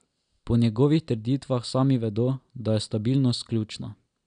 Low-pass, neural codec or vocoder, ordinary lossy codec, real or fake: 9.9 kHz; none; none; real